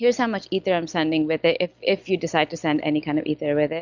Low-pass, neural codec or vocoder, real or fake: 7.2 kHz; none; real